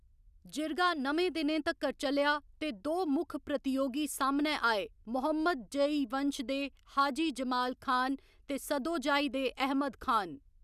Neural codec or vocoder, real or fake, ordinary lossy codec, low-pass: none; real; none; 14.4 kHz